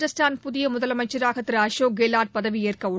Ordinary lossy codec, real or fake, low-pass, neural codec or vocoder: none; real; none; none